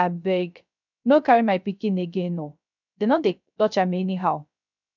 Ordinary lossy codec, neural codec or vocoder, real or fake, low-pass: none; codec, 16 kHz, 0.3 kbps, FocalCodec; fake; 7.2 kHz